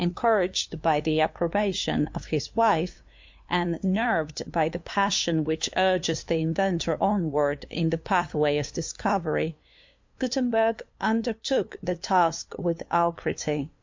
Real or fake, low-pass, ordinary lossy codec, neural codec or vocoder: fake; 7.2 kHz; MP3, 48 kbps; codec, 16 kHz, 2 kbps, FunCodec, trained on LibriTTS, 25 frames a second